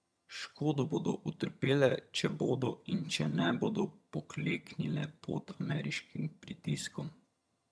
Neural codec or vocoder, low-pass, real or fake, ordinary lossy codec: vocoder, 22.05 kHz, 80 mel bands, HiFi-GAN; none; fake; none